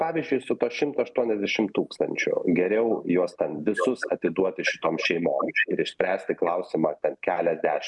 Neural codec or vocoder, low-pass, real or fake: none; 10.8 kHz; real